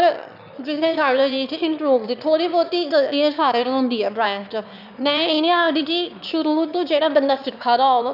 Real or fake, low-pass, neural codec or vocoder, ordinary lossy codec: fake; 5.4 kHz; autoencoder, 22.05 kHz, a latent of 192 numbers a frame, VITS, trained on one speaker; none